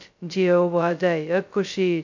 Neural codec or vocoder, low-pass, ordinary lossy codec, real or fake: codec, 16 kHz, 0.2 kbps, FocalCodec; 7.2 kHz; MP3, 64 kbps; fake